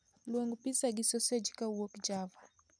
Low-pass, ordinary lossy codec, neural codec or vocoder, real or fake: 9.9 kHz; none; none; real